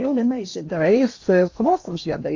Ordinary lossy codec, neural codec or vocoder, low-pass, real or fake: AAC, 48 kbps; codec, 16 kHz in and 24 kHz out, 0.8 kbps, FocalCodec, streaming, 65536 codes; 7.2 kHz; fake